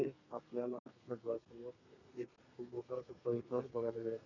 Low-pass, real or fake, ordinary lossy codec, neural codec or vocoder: 7.2 kHz; fake; none; codec, 32 kHz, 1.9 kbps, SNAC